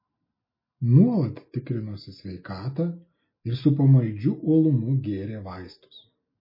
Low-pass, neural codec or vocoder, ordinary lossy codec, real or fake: 5.4 kHz; none; MP3, 24 kbps; real